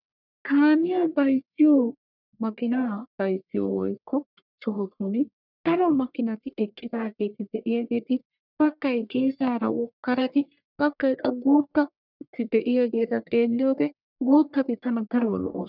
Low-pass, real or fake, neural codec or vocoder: 5.4 kHz; fake; codec, 44.1 kHz, 1.7 kbps, Pupu-Codec